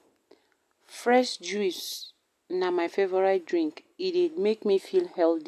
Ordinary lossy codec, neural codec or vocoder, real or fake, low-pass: none; none; real; 14.4 kHz